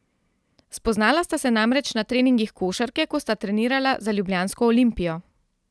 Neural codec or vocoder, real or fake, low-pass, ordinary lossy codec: none; real; none; none